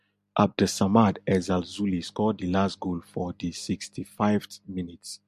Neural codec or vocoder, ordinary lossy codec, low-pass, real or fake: none; MP3, 64 kbps; 14.4 kHz; real